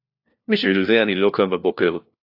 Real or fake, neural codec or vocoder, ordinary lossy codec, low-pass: fake; codec, 16 kHz, 1 kbps, FunCodec, trained on LibriTTS, 50 frames a second; AAC, 32 kbps; 5.4 kHz